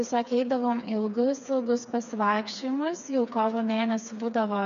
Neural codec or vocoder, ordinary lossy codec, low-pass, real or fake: codec, 16 kHz, 4 kbps, FreqCodec, smaller model; MP3, 96 kbps; 7.2 kHz; fake